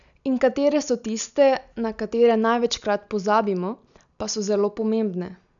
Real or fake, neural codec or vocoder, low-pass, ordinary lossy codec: real; none; 7.2 kHz; none